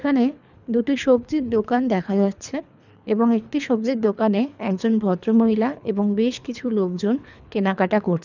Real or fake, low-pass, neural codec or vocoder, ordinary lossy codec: fake; 7.2 kHz; codec, 24 kHz, 3 kbps, HILCodec; none